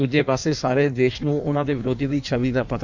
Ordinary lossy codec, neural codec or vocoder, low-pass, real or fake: none; codec, 16 kHz in and 24 kHz out, 1.1 kbps, FireRedTTS-2 codec; 7.2 kHz; fake